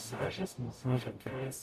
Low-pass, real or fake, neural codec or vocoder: 14.4 kHz; fake; codec, 44.1 kHz, 0.9 kbps, DAC